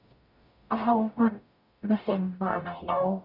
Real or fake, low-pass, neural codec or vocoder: fake; 5.4 kHz; codec, 44.1 kHz, 0.9 kbps, DAC